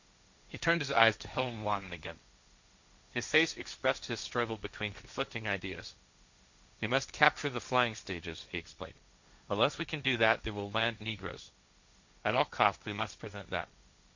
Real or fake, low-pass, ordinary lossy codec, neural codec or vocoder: fake; 7.2 kHz; Opus, 64 kbps; codec, 16 kHz, 1.1 kbps, Voila-Tokenizer